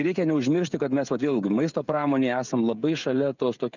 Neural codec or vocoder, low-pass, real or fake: codec, 16 kHz, 16 kbps, FreqCodec, smaller model; 7.2 kHz; fake